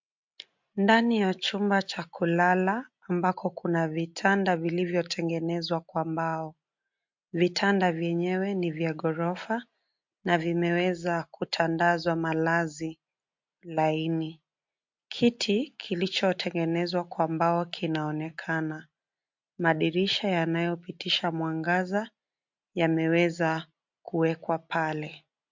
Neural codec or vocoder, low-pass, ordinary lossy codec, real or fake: none; 7.2 kHz; MP3, 48 kbps; real